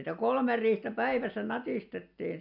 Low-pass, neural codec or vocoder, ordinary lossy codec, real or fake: 5.4 kHz; none; none; real